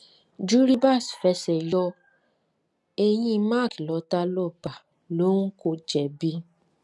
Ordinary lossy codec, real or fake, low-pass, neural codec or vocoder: none; real; none; none